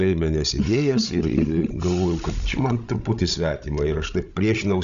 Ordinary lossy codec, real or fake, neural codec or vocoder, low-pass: Opus, 64 kbps; fake; codec, 16 kHz, 16 kbps, FreqCodec, larger model; 7.2 kHz